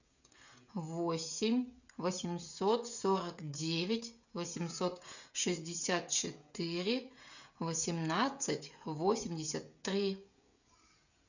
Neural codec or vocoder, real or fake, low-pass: codec, 16 kHz, 16 kbps, FreqCodec, smaller model; fake; 7.2 kHz